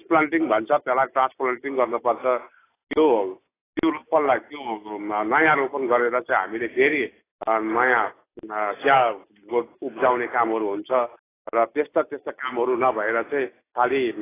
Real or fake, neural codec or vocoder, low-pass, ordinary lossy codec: real; none; 3.6 kHz; AAC, 16 kbps